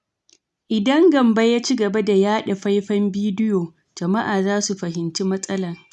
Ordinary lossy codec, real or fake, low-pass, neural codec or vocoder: none; real; none; none